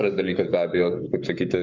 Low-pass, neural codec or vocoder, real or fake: 7.2 kHz; codec, 44.1 kHz, 7.8 kbps, Pupu-Codec; fake